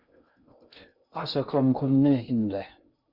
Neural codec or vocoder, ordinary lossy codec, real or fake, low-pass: codec, 16 kHz in and 24 kHz out, 0.6 kbps, FocalCodec, streaming, 2048 codes; Opus, 64 kbps; fake; 5.4 kHz